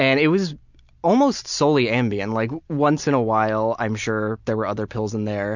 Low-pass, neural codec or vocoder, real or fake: 7.2 kHz; none; real